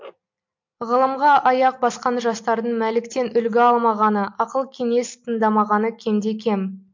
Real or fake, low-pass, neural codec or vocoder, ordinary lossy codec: real; 7.2 kHz; none; MP3, 48 kbps